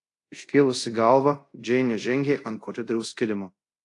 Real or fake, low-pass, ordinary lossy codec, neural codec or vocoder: fake; 10.8 kHz; AAC, 48 kbps; codec, 24 kHz, 0.5 kbps, DualCodec